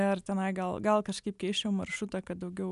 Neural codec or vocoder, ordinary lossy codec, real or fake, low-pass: none; MP3, 96 kbps; real; 10.8 kHz